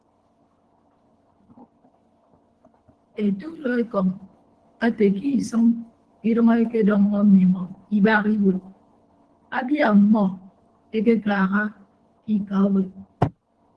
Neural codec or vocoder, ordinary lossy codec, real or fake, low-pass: codec, 24 kHz, 3 kbps, HILCodec; Opus, 16 kbps; fake; 10.8 kHz